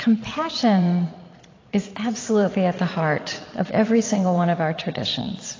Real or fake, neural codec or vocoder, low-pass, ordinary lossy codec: fake; vocoder, 22.05 kHz, 80 mel bands, Vocos; 7.2 kHz; AAC, 32 kbps